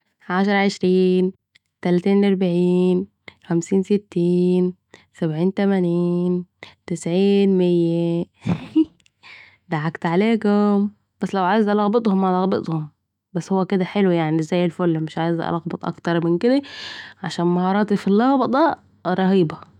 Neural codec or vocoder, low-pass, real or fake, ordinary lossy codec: autoencoder, 48 kHz, 128 numbers a frame, DAC-VAE, trained on Japanese speech; 19.8 kHz; fake; none